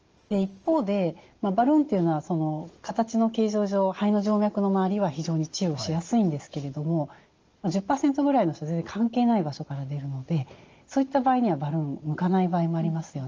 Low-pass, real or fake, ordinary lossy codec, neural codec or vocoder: 7.2 kHz; real; Opus, 24 kbps; none